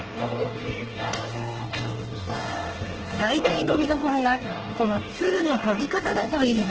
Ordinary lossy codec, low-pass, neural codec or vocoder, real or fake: Opus, 16 kbps; 7.2 kHz; codec, 24 kHz, 1 kbps, SNAC; fake